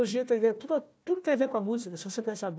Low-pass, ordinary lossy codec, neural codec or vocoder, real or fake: none; none; codec, 16 kHz, 1 kbps, FunCodec, trained on Chinese and English, 50 frames a second; fake